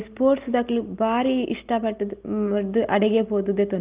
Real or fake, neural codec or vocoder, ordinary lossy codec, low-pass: real; none; Opus, 32 kbps; 3.6 kHz